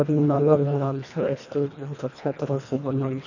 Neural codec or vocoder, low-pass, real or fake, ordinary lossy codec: codec, 24 kHz, 1.5 kbps, HILCodec; 7.2 kHz; fake; none